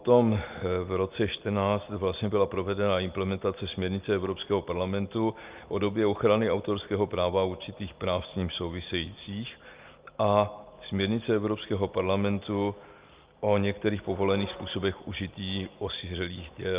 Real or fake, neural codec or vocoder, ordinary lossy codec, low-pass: real; none; Opus, 32 kbps; 3.6 kHz